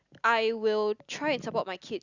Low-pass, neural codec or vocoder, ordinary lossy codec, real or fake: 7.2 kHz; none; Opus, 64 kbps; real